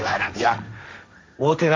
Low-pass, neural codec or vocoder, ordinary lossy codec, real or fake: none; codec, 16 kHz, 1.1 kbps, Voila-Tokenizer; none; fake